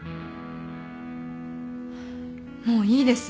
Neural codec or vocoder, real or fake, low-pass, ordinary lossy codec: none; real; none; none